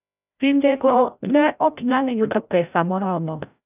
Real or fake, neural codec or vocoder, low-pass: fake; codec, 16 kHz, 0.5 kbps, FreqCodec, larger model; 3.6 kHz